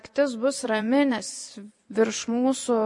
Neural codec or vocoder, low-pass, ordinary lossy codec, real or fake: vocoder, 24 kHz, 100 mel bands, Vocos; 10.8 kHz; MP3, 48 kbps; fake